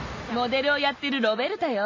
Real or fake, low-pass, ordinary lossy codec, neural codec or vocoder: real; 7.2 kHz; MP3, 32 kbps; none